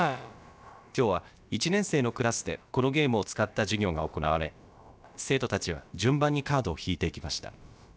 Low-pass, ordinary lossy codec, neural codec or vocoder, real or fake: none; none; codec, 16 kHz, about 1 kbps, DyCAST, with the encoder's durations; fake